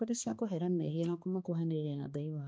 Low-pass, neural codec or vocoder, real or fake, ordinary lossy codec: none; codec, 16 kHz, 2 kbps, X-Codec, HuBERT features, trained on balanced general audio; fake; none